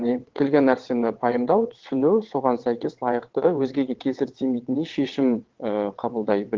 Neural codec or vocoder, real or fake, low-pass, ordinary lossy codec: vocoder, 22.05 kHz, 80 mel bands, WaveNeXt; fake; 7.2 kHz; Opus, 16 kbps